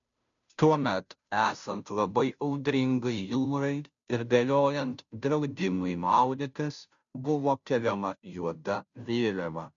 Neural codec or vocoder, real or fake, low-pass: codec, 16 kHz, 0.5 kbps, FunCodec, trained on Chinese and English, 25 frames a second; fake; 7.2 kHz